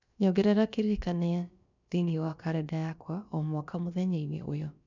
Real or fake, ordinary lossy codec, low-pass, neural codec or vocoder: fake; none; 7.2 kHz; codec, 16 kHz, about 1 kbps, DyCAST, with the encoder's durations